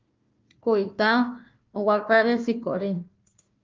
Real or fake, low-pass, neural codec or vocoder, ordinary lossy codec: fake; 7.2 kHz; codec, 16 kHz, 1 kbps, FunCodec, trained on Chinese and English, 50 frames a second; Opus, 24 kbps